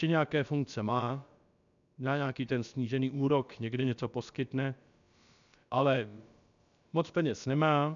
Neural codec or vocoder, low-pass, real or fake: codec, 16 kHz, about 1 kbps, DyCAST, with the encoder's durations; 7.2 kHz; fake